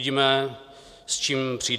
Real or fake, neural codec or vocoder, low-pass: real; none; 14.4 kHz